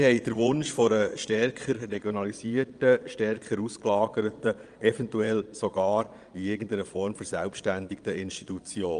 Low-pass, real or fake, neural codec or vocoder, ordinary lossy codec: 9.9 kHz; fake; vocoder, 22.05 kHz, 80 mel bands, Vocos; Opus, 32 kbps